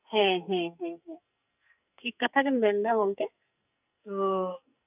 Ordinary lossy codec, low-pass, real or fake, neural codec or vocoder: none; 3.6 kHz; fake; codec, 44.1 kHz, 2.6 kbps, SNAC